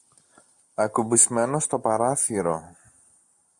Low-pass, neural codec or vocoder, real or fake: 10.8 kHz; none; real